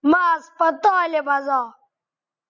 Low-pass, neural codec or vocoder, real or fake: 7.2 kHz; none; real